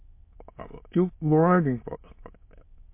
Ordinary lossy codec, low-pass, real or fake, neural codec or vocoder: MP3, 24 kbps; 3.6 kHz; fake; autoencoder, 22.05 kHz, a latent of 192 numbers a frame, VITS, trained on many speakers